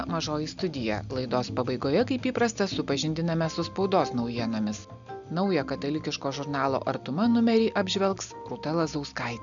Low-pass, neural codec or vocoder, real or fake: 7.2 kHz; none; real